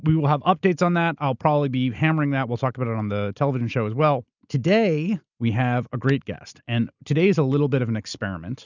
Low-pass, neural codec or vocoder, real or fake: 7.2 kHz; none; real